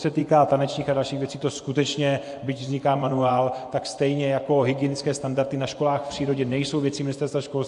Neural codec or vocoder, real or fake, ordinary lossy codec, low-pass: vocoder, 24 kHz, 100 mel bands, Vocos; fake; AAC, 64 kbps; 10.8 kHz